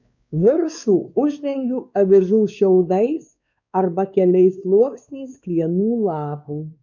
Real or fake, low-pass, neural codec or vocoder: fake; 7.2 kHz; codec, 16 kHz, 2 kbps, X-Codec, WavLM features, trained on Multilingual LibriSpeech